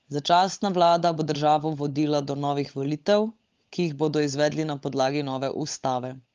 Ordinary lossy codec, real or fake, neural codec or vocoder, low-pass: Opus, 32 kbps; fake; codec, 16 kHz, 16 kbps, FunCodec, trained on LibriTTS, 50 frames a second; 7.2 kHz